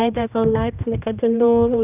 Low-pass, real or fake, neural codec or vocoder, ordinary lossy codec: 3.6 kHz; fake; codec, 16 kHz, 1 kbps, X-Codec, HuBERT features, trained on general audio; none